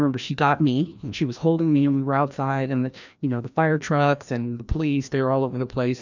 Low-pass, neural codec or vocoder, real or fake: 7.2 kHz; codec, 16 kHz, 1 kbps, FreqCodec, larger model; fake